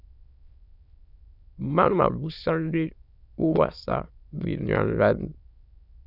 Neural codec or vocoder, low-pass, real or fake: autoencoder, 22.05 kHz, a latent of 192 numbers a frame, VITS, trained on many speakers; 5.4 kHz; fake